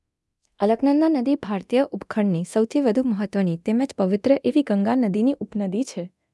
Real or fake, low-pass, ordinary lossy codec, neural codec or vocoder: fake; none; none; codec, 24 kHz, 0.9 kbps, DualCodec